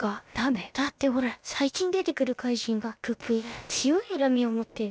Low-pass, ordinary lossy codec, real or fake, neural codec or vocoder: none; none; fake; codec, 16 kHz, about 1 kbps, DyCAST, with the encoder's durations